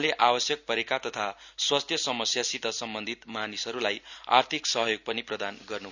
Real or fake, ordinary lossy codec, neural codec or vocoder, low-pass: real; none; none; 7.2 kHz